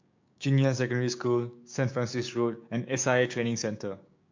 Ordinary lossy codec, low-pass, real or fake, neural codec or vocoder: MP3, 48 kbps; 7.2 kHz; fake; codec, 16 kHz, 6 kbps, DAC